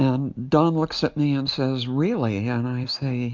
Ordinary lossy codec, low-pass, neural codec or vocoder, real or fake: MP3, 64 kbps; 7.2 kHz; none; real